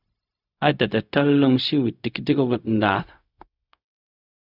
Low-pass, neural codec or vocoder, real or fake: 5.4 kHz; codec, 16 kHz, 0.4 kbps, LongCat-Audio-Codec; fake